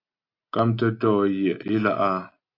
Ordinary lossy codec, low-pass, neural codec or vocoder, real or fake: AAC, 32 kbps; 5.4 kHz; none; real